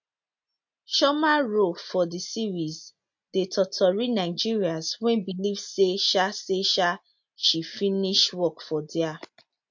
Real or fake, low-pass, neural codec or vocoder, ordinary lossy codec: real; 7.2 kHz; none; MP3, 48 kbps